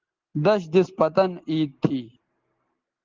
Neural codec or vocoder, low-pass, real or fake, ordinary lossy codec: none; 7.2 kHz; real; Opus, 16 kbps